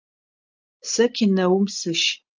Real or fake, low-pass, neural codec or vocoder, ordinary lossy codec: real; 7.2 kHz; none; Opus, 24 kbps